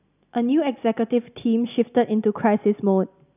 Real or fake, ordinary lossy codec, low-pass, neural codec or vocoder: real; none; 3.6 kHz; none